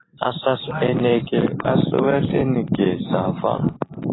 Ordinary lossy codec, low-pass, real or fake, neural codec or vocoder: AAC, 16 kbps; 7.2 kHz; fake; vocoder, 44.1 kHz, 128 mel bands every 512 samples, BigVGAN v2